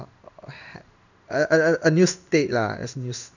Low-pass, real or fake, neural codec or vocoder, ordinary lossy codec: 7.2 kHz; fake; codec, 16 kHz in and 24 kHz out, 1 kbps, XY-Tokenizer; none